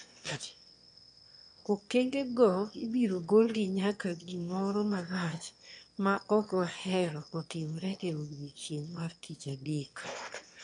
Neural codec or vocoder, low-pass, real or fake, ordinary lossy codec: autoencoder, 22.05 kHz, a latent of 192 numbers a frame, VITS, trained on one speaker; 9.9 kHz; fake; MP3, 64 kbps